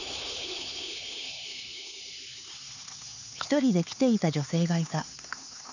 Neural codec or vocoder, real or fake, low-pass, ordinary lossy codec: codec, 16 kHz, 4 kbps, X-Codec, HuBERT features, trained on LibriSpeech; fake; 7.2 kHz; none